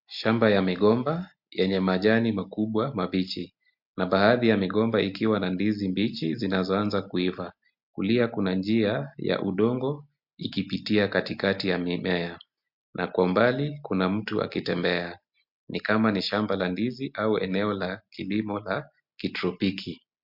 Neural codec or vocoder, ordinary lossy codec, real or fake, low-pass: none; MP3, 48 kbps; real; 5.4 kHz